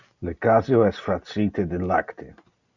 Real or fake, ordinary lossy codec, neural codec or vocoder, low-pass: real; Opus, 64 kbps; none; 7.2 kHz